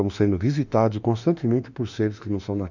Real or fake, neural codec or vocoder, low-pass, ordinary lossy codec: fake; autoencoder, 48 kHz, 32 numbers a frame, DAC-VAE, trained on Japanese speech; 7.2 kHz; none